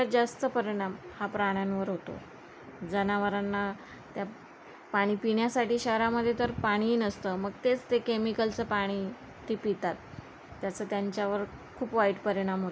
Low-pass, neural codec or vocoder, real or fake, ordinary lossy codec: none; none; real; none